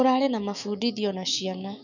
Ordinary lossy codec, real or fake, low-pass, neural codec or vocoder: none; fake; 7.2 kHz; autoencoder, 48 kHz, 128 numbers a frame, DAC-VAE, trained on Japanese speech